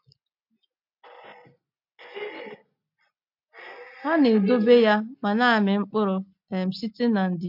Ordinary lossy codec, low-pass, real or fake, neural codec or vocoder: MP3, 48 kbps; 5.4 kHz; real; none